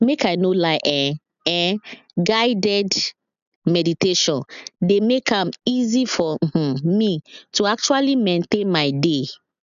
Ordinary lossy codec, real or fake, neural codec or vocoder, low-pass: none; real; none; 7.2 kHz